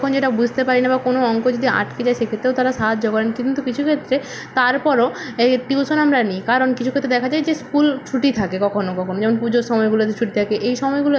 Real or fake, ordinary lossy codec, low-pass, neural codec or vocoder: real; none; none; none